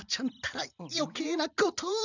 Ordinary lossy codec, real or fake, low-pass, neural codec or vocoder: none; fake; 7.2 kHz; vocoder, 44.1 kHz, 128 mel bands every 256 samples, BigVGAN v2